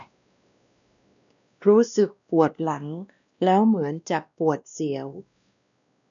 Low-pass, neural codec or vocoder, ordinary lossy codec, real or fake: 7.2 kHz; codec, 16 kHz, 1 kbps, X-Codec, WavLM features, trained on Multilingual LibriSpeech; none; fake